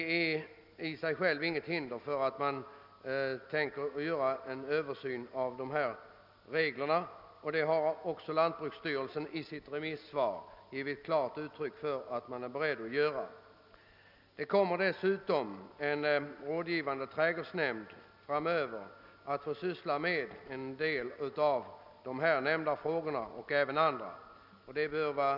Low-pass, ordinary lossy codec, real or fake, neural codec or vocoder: 5.4 kHz; none; real; none